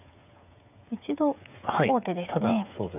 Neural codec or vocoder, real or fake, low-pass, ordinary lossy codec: codec, 16 kHz, 8 kbps, FreqCodec, smaller model; fake; 3.6 kHz; none